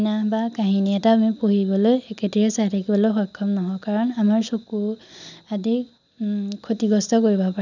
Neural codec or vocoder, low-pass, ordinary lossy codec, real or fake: none; 7.2 kHz; none; real